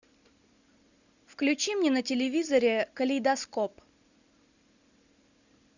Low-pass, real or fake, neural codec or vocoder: 7.2 kHz; real; none